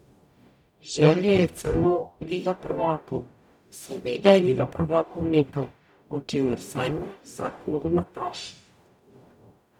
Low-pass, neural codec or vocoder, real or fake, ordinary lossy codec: 19.8 kHz; codec, 44.1 kHz, 0.9 kbps, DAC; fake; none